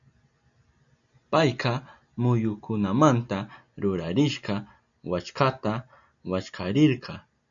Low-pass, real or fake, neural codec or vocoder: 7.2 kHz; real; none